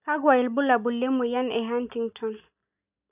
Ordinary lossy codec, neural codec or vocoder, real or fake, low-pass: none; none; real; 3.6 kHz